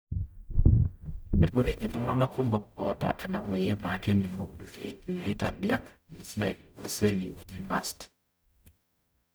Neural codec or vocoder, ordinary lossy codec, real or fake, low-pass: codec, 44.1 kHz, 0.9 kbps, DAC; none; fake; none